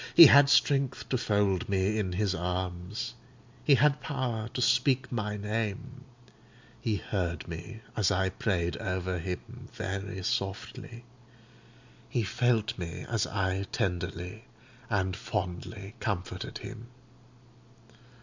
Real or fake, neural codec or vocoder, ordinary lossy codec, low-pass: real; none; MP3, 64 kbps; 7.2 kHz